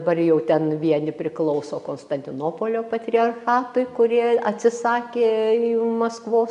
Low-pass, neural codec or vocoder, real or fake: 10.8 kHz; none; real